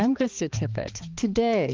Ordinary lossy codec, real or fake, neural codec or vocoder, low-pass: Opus, 32 kbps; fake; codec, 16 kHz, 2 kbps, X-Codec, HuBERT features, trained on balanced general audio; 7.2 kHz